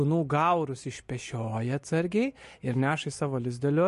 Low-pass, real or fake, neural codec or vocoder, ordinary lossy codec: 14.4 kHz; real; none; MP3, 48 kbps